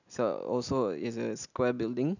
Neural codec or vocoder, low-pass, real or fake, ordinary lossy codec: none; 7.2 kHz; real; none